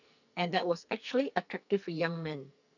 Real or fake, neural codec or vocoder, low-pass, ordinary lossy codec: fake; codec, 44.1 kHz, 2.6 kbps, SNAC; 7.2 kHz; none